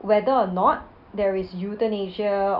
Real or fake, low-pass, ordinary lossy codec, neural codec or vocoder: real; 5.4 kHz; none; none